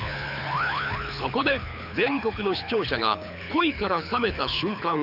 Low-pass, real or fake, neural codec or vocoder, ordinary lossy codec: 5.4 kHz; fake; codec, 24 kHz, 6 kbps, HILCodec; none